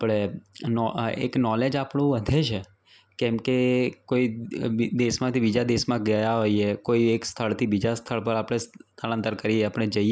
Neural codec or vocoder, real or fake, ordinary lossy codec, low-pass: none; real; none; none